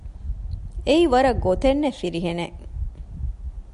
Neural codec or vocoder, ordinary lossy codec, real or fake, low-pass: none; MP3, 96 kbps; real; 10.8 kHz